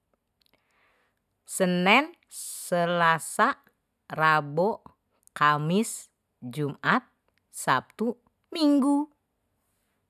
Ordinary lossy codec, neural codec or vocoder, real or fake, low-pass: none; none; real; 14.4 kHz